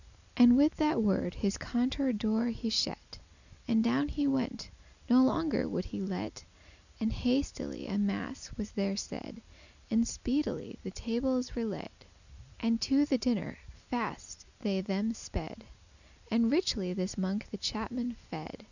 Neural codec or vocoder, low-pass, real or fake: none; 7.2 kHz; real